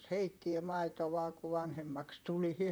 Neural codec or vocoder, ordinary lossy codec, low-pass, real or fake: vocoder, 44.1 kHz, 128 mel bands, Pupu-Vocoder; none; none; fake